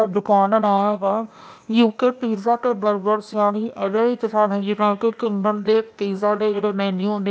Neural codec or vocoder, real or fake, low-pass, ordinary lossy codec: codec, 16 kHz, 0.8 kbps, ZipCodec; fake; none; none